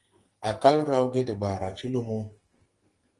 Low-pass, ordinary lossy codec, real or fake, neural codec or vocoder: 10.8 kHz; Opus, 24 kbps; fake; codec, 44.1 kHz, 2.6 kbps, SNAC